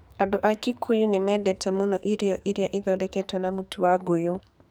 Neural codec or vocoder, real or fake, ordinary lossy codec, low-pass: codec, 44.1 kHz, 2.6 kbps, SNAC; fake; none; none